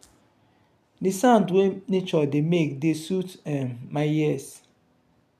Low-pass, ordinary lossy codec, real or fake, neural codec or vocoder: 14.4 kHz; none; real; none